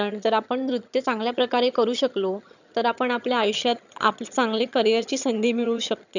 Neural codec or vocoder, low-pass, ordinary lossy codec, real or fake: vocoder, 22.05 kHz, 80 mel bands, HiFi-GAN; 7.2 kHz; none; fake